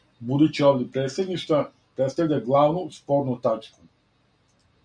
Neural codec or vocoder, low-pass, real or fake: none; 9.9 kHz; real